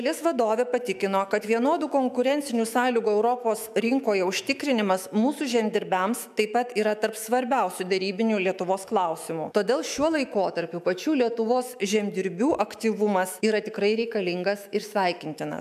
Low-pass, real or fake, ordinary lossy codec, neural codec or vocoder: 14.4 kHz; fake; MP3, 96 kbps; autoencoder, 48 kHz, 128 numbers a frame, DAC-VAE, trained on Japanese speech